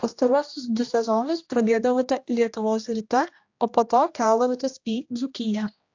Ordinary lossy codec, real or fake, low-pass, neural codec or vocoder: AAC, 48 kbps; fake; 7.2 kHz; codec, 16 kHz, 1 kbps, X-Codec, HuBERT features, trained on general audio